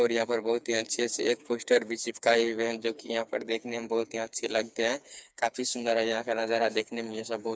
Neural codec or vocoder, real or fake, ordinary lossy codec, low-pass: codec, 16 kHz, 4 kbps, FreqCodec, smaller model; fake; none; none